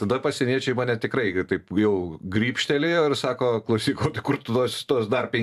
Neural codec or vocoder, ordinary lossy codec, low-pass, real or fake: vocoder, 44.1 kHz, 128 mel bands every 512 samples, BigVGAN v2; AAC, 96 kbps; 14.4 kHz; fake